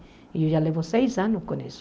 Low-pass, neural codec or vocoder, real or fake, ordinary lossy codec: none; none; real; none